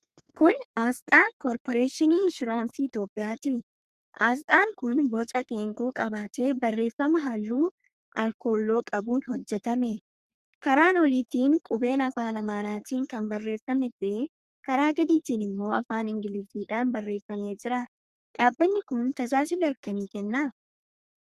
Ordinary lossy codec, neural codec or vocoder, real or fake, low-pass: Opus, 64 kbps; codec, 32 kHz, 1.9 kbps, SNAC; fake; 14.4 kHz